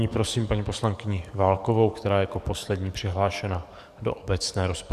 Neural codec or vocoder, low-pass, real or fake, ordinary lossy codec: codec, 44.1 kHz, 7.8 kbps, DAC; 14.4 kHz; fake; Opus, 64 kbps